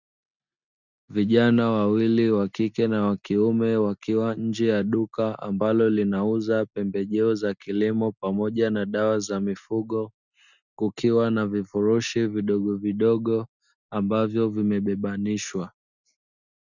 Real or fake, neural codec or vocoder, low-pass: real; none; 7.2 kHz